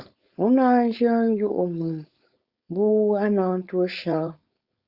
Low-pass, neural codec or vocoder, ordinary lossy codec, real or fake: 5.4 kHz; codec, 16 kHz, 4.8 kbps, FACodec; Opus, 64 kbps; fake